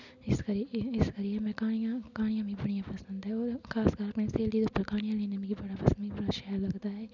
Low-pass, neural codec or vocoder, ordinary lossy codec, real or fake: 7.2 kHz; none; none; real